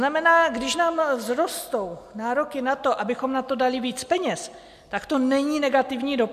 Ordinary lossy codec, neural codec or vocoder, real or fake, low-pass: MP3, 96 kbps; none; real; 14.4 kHz